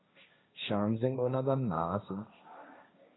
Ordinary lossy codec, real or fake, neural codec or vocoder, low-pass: AAC, 16 kbps; fake; codec, 16 kHz, 1.1 kbps, Voila-Tokenizer; 7.2 kHz